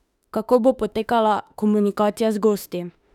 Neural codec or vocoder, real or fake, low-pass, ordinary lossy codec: autoencoder, 48 kHz, 32 numbers a frame, DAC-VAE, trained on Japanese speech; fake; 19.8 kHz; none